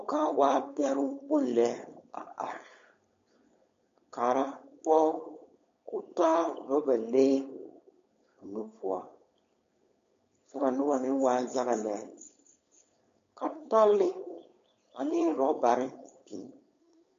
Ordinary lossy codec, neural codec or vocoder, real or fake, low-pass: MP3, 48 kbps; codec, 16 kHz, 4.8 kbps, FACodec; fake; 7.2 kHz